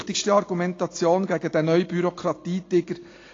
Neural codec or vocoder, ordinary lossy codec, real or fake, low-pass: none; AAC, 32 kbps; real; 7.2 kHz